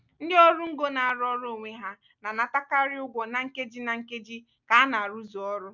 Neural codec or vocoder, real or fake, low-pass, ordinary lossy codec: none; real; 7.2 kHz; none